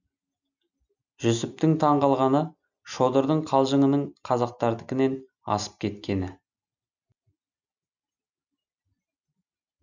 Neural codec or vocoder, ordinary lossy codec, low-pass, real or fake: none; none; 7.2 kHz; real